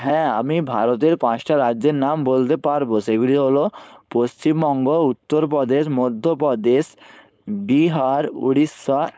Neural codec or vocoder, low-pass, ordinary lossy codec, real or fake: codec, 16 kHz, 4.8 kbps, FACodec; none; none; fake